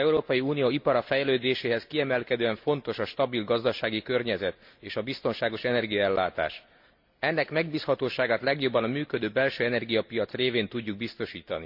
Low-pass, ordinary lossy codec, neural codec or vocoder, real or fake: 5.4 kHz; MP3, 48 kbps; none; real